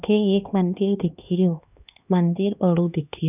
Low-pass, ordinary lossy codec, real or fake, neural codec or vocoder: 3.6 kHz; none; fake; codec, 16 kHz, 2 kbps, X-Codec, HuBERT features, trained on LibriSpeech